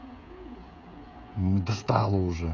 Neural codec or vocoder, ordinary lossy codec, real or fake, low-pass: codec, 16 kHz, 16 kbps, FreqCodec, smaller model; none; fake; 7.2 kHz